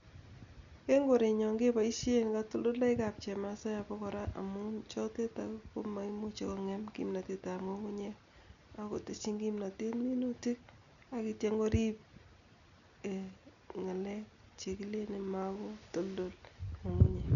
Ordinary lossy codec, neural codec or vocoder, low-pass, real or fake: none; none; 7.2 kHz; real